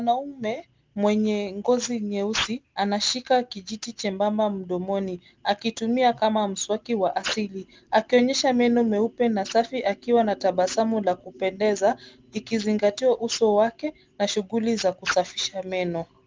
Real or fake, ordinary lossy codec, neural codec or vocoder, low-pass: real; Opus, 32 kbps; none; 7.2 kHz